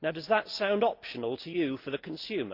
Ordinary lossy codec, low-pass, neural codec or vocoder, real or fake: Opus, 24 kbps; 5.4 kHz; none; real